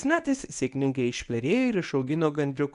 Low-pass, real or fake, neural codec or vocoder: 10.8 kHz; fake; codec, 24 kHz, 0.9 kbps, WavTokenizer, medium speech release version 1